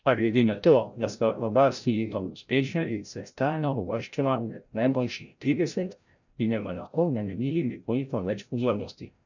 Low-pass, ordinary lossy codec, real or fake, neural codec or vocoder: 7.2 kHz; none; fake; codec, 16 kHz, 0.5 kbps, FreqCodec, larger model